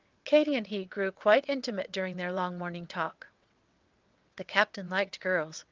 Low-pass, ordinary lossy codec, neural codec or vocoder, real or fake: 7.2 kHz; Opus, 32 kbps; vocoder, 22.05 kHz, 80 mel bands, WaveNeXt; fake